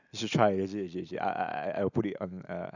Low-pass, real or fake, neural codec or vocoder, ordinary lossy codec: 7.2 kHz; real; none; none